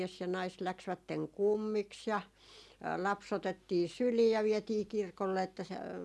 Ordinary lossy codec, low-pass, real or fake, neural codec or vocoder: none; none; real; none